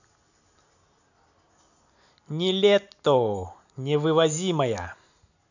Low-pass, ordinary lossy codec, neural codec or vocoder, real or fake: 7.2 kHz; none; none; real